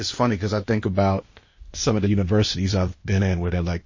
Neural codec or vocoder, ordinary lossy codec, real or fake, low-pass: codec, 16 kHz, 0.8 kbps, ZipCodec; MP3, 32 kbps; fake; 7.2 kHz